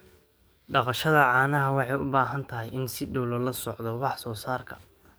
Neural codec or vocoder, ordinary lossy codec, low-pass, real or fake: codec, 44.1 kHz, 7.8 kbps, DAC; none; none; fake